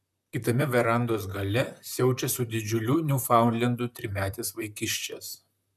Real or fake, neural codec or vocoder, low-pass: fake; vocoder, 44.1 kHz, 128 mel bands, Pupu-Vocoder; 14.4 kHz